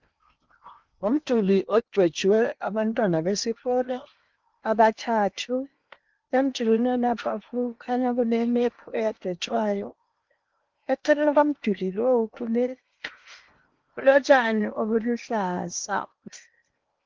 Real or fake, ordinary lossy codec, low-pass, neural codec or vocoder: fake; Opus, 32 kbps; 7.2 kHz; codec, 16 kHz in and 24 kHz out, 0.8 kbps, FocalCodec, streaming, 65536 codes